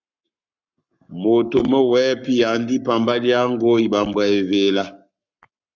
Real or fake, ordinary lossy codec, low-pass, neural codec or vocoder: fake; Opus, 64 kbps; 7.2 kHz; codec, 44.1 kHz, 7.8 kbps, Pupu-Codec